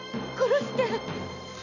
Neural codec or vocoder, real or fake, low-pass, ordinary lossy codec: none; real; 7.2 kHz; none